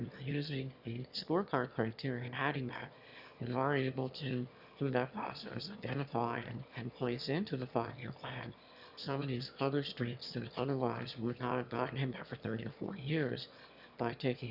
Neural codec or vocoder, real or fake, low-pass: autoencoder, 22.05 kHz, a latent of 192 numbers a frame, VITS, trained on one speaker; fake; 5.4 kHz